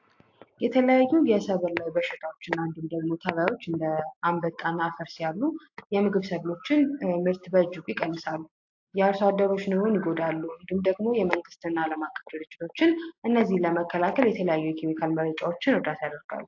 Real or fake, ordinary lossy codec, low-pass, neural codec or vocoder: real; AAC, 48 kbps; 7.2 kHz; none